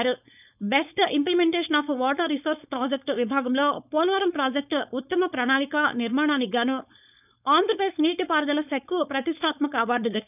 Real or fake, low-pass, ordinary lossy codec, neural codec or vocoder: fake; 3.6 kHz; none; codec, 16 kHz, 4.8 kbps, FACodec